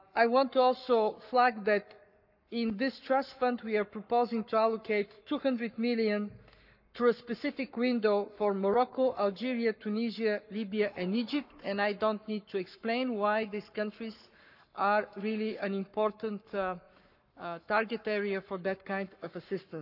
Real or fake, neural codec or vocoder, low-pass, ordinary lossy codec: fake; codec, 44.1 kHz, 7.8 kbps, Pupu-Codec; 5.4 kHz; none